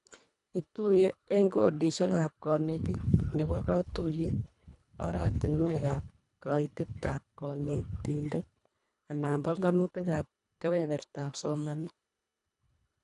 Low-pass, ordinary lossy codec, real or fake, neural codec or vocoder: 10.8 kHz; none; fake; codec, 24 kHz, 1.5 kbps, HILCodec